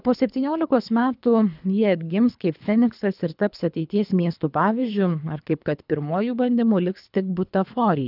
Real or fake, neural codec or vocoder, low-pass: fake; codec, 24 kHz, 3 kbps, HILCodec; 5.4 kHz